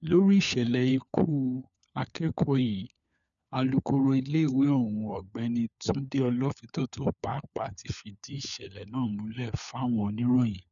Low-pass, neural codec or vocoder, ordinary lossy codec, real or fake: 7.2 kHz; codec, 16 kHz, 4 kbps, FunCodec, trained on LibriTTS, 50 frames a second; none; fake